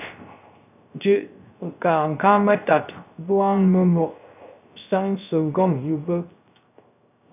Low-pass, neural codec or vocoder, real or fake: 3.6 kHz; codec, 16 kHz, 0.3 kbps, FocalCodec; fake